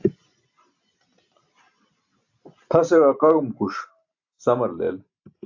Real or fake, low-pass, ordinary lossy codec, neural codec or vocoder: real; 7.2 kHz; AAC, 48 kbps; none